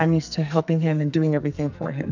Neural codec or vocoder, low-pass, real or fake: codec, 44.1 kHz, 2.6 kbps, SNAC; 7.2 kHz; fake